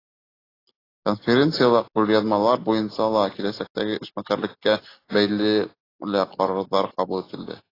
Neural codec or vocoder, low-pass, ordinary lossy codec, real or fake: none; 5.4 kHz; AAC, 24 kbps; real